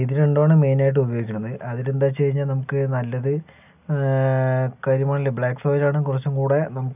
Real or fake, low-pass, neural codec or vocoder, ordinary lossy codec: real; 3.6 kHz; none; none